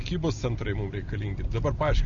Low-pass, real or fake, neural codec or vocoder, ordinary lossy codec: 7.2 kHz; real; none; Opus, 64 kbps